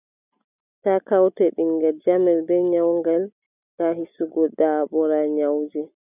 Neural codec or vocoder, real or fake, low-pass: none; real; 3.6 kHz